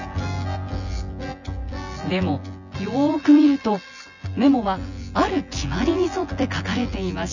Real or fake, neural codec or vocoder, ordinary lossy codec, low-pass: fake; vocoder, 24 kHz, 100 mel bands, Vocos; none; 7.2 kHz